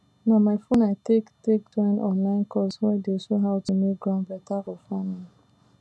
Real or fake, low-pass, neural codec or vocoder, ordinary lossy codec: real; none; none; none